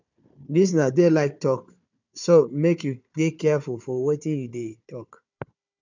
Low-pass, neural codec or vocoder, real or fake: 7.2 kHz; codec, 16 kHz, 4 kbps, FunCodec, trained on Chinese and English, 50 frames a second; fake